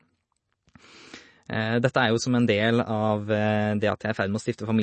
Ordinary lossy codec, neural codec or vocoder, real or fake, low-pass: MP3, 32 kbps; none; real; 10.8 kHz